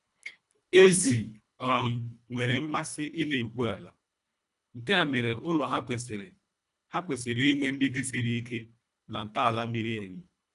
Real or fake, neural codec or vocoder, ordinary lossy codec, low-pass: fake; codec, 24 kHz, 1.5 kbps, HILCodec; none; 10.8 kHz